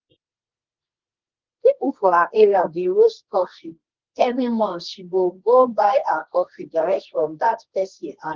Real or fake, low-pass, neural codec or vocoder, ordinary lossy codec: fake; 7.2 kHz; codec, 24 kHz, 0.9 kbps, WavTokenizer, medium music audio release; Opus, 16 kbps